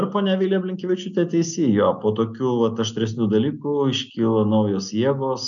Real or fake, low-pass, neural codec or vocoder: real; 7.2 kHz; none